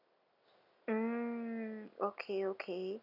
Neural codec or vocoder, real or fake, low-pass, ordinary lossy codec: none; real; 5.4 kHz; none